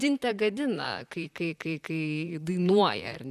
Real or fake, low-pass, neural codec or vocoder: fake; 14.4 kHz; vocoder, 44.1 kHz, 128 mel bands, Pupu-Vocoder